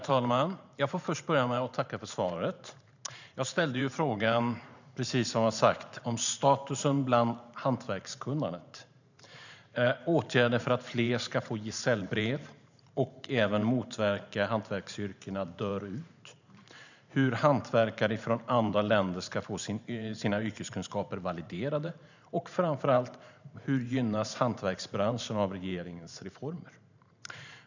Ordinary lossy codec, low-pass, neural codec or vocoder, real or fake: none; 7.2 kHz; vocoder, 44.1 kHz, 128 mel bands every 512 samples, BigVGAN v2; fake